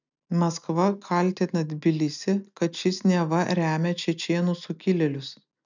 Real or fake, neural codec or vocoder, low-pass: real; none; 7.2 kHz